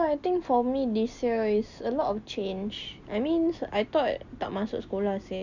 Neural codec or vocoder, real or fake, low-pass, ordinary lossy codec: none; real; 7.2 kHz; none